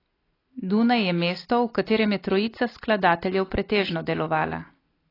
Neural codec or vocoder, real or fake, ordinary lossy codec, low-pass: none; real; AAC, 24 kbps; 5.4 kHz